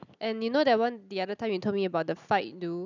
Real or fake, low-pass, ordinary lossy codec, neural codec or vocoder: real; 7.2 kHz; none; none